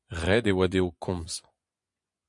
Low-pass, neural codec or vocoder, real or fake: 10.8 kHz; vocoder, 24 kHz, 100 mel bands, Vocos; fake